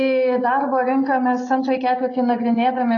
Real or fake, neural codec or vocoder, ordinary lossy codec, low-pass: real; none; AAC, 32 kbps; 7.2 kHz